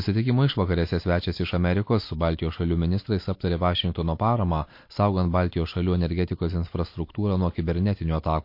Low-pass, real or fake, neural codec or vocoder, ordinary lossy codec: 5.4 kHz; real; none; MP3, 32 kbps